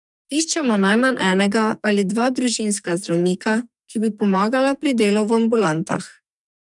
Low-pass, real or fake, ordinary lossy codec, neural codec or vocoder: 10.8 kHz; fake; none; codec, 44.1 kHz, 2.6 kbps, SNAC